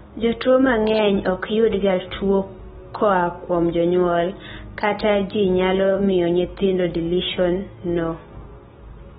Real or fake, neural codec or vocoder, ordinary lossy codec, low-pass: real; none; AAC, 16 kbps; 19.8 kHz